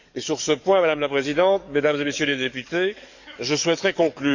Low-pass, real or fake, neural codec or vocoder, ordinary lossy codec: 7.2 kHz; fake; codec, 44.1 kHz, 7.8 kbps, DAC; none